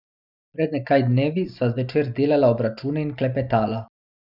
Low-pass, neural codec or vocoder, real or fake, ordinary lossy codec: 5.4 kHz; none; real; none